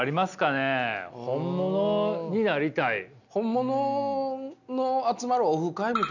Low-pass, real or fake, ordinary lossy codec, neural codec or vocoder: 7.2 kHz; real; none; none